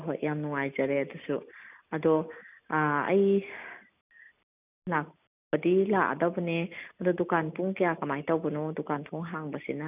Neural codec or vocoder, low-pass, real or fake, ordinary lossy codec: none; 3.6 kHz; real; none